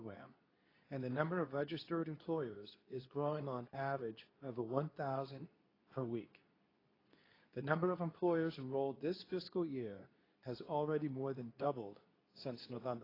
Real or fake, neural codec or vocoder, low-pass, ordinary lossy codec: fake; codec, 24 kHz, 0.9 kbps, WavTokenizer, medium speech release version 2; 5.4 kHz; AAC, 24 kbps